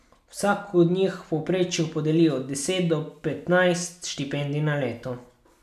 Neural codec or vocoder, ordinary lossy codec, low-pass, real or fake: none; none; 14.4 kHz; real